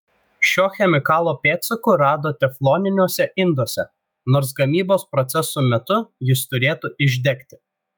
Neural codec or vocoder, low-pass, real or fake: autoencoder, 48 kHz, 128 numbers a frame, DAC-VAE, trained on Japanese speech; 19.8 kHz; fake